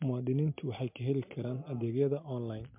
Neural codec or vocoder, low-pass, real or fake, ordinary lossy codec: none; 3.6 kHz; real; MP3, 32 kbps